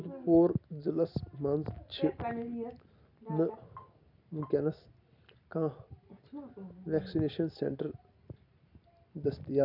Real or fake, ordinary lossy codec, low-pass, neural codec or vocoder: real; none; 5.4 kHz; none